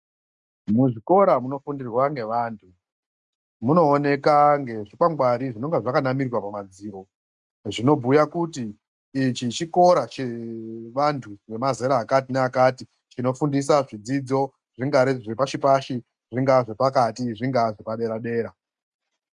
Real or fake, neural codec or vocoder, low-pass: real; none; 10.8 kHz